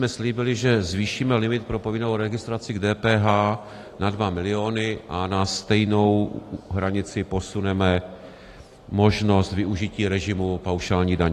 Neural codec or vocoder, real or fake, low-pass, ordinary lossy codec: none; real; 14.4 kHz; AAC, 48 kbps